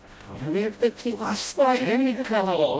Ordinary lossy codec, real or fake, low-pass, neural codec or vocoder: none; fake; none; codec, 16 kHz, 0.5 kbps, FreqCodec, smaller model